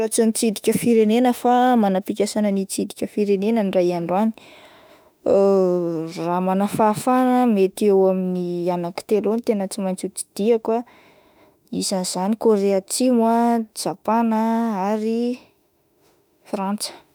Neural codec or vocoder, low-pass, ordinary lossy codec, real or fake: autoencoder, 48 kHz, 32 numbers a frame, DAC-VAE, trained on Japanese speech; none; none; fake